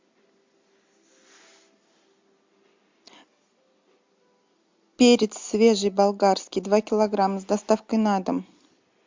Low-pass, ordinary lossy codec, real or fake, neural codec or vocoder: 7.2 kHz; MP3, 64 kbps; real; none